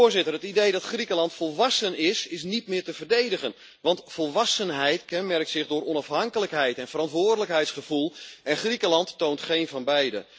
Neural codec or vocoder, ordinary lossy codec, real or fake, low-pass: none; none; real; none